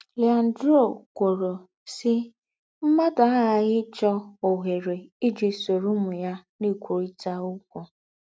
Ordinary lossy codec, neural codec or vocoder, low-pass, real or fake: none; none; none; real